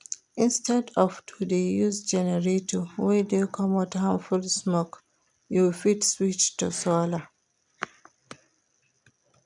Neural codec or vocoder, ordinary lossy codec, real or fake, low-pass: none; none; real; 10.8 kHz